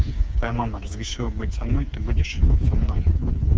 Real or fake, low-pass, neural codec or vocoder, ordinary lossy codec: fake; none; codec, 16 kHz, 4 kbps, FreqCodec, smaller model; none